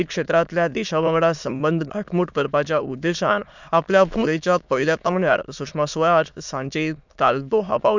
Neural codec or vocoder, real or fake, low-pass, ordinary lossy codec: autoencoder, 22.05 kHz, a latent of 192 numbers a frame, VITS, trained on many speakers; fake; 7.2 kHz; none